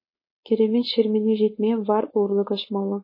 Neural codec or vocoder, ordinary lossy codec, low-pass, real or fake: codec, 16 kHz, 4.8 kbps, FACodec; MP3, 24 kbps; 5.4 kHz; fake